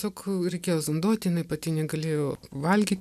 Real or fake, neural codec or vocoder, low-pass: real; none; 14.4 kHz